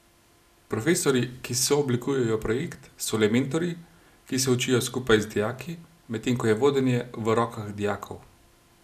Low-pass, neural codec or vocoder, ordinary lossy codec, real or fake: 14.4 kHz; none; none; real